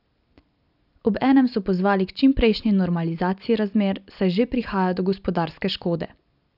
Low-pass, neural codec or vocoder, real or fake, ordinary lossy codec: 5.4 kHz; none; real; none